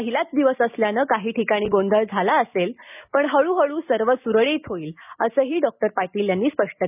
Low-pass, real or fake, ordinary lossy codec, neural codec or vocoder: 3.6 kHz; real; none; none